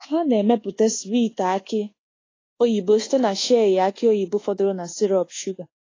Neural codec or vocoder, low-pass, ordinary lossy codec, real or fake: codec, 24 kHz, 1.2 kbps, DualCodec; 7.2 kHz; AAC, 32 kbps; fake